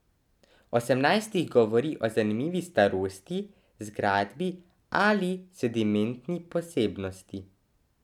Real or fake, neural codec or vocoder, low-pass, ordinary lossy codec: real; none; 19.8 kHz; none